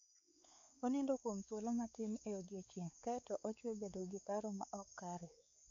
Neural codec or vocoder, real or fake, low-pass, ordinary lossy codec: codec, 16 kHz, 4 kbps, X-Codec, WavLM features, trained on Multilingual LibriSpeech; fake; 7.2 kHz; none